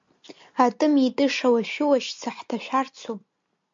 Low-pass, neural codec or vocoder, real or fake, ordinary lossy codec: 7.2 kHz; none; real; MP3, 48 kbps